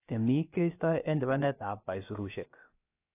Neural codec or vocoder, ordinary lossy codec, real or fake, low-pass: codec, 16 kHz, about 1 kbps, DyCAST, with the encoder's durations; AAC, 24 kbps; fake; 3.6 kHz